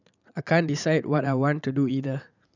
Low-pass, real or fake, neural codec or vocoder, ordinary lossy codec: 7.2 kHz; fake; vocoder, 44.1 kHz, 80 mel bands, Vocos; none